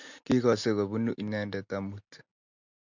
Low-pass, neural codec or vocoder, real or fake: 7.2 kHz; none; real